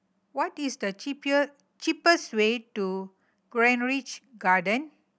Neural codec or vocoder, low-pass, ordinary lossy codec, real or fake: none; none; none; real